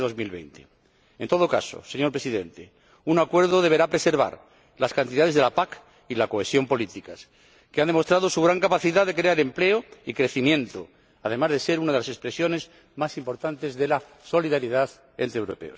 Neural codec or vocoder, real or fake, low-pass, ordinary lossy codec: none; real; none; none